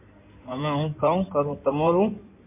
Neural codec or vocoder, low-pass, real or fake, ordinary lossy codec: codec, 16 kHz in and 24 kHz out, 2.2 kbps, FireRedTTS-2 codec; 3.6 kHz; fake; MP3, 24 kbps